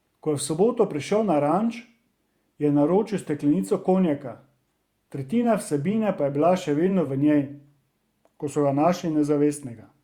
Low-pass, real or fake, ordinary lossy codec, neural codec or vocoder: 19.8 kHz; fake; Opus, 64 kbps; vocoder, 48 kHz, 128 mel bands, Vocos